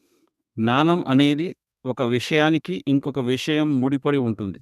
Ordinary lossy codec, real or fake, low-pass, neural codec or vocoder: none; fake; 14.4 kHz; codec, 32 kHz, 1.9 kbps, SNAC